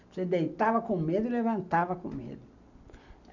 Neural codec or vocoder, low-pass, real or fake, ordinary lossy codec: none; 7.2 kHz; real; none